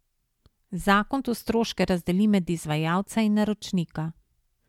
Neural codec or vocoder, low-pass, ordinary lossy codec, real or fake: none; 19.8 kHz; MP3, 96 kbps; real